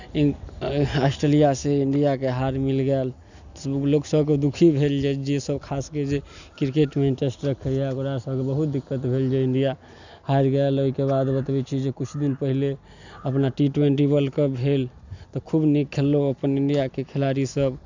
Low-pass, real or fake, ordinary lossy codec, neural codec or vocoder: 7.2 kHz; real; none; none